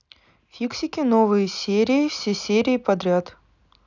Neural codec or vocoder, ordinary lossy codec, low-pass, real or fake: none; none; 7.2 kHz; real